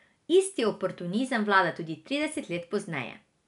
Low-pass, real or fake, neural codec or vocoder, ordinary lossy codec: 10.8 kHz; real; none; none